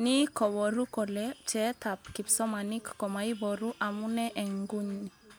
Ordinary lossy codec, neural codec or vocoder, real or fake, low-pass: none; none; real; none